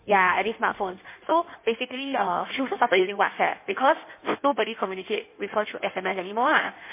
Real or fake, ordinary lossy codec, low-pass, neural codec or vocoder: fake; MP3, 24 kbps; 3.6 kHz; codec, 16 kHz in and 24 kHz out, 1.1 kbps, FireRedTTS-2 codec